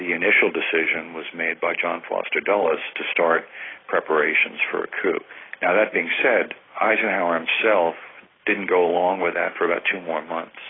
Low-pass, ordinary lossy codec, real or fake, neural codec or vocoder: 7.2 kHz; AAC, 16 kbps; real; none